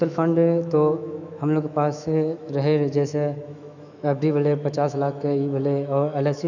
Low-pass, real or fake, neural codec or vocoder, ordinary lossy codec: 7.2 kHz; fake; autoencoder, 48 kHz, 128 numbers a frame, DAC-VAE, trained on Japanese speech; none